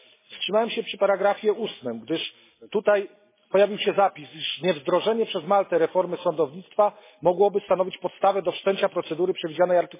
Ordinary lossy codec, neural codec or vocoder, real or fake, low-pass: MP3, 16 kbps; none; real; 3.6 kHz